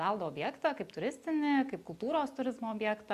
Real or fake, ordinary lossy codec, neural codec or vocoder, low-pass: real; Opus, 64 kbps; none; 14.4 kHz